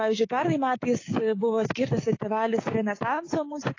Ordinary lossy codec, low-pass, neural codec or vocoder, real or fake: AAC, 32 kbps; 7.2 kHz; codec, 16 kHz, 6 kbps, DAC; fake